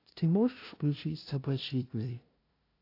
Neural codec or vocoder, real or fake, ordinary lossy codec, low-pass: codec, 16 kHz, 0.5 kbps, FunCodec, trained on LibriTTS, 25 frames a second; fake; AAC, 32 kbps; 5.4 kHz